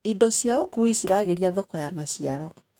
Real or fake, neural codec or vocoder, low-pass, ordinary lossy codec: fake; codec, 44.1 kHz, 2.6 kbps, DAC; 19.8 kHz; none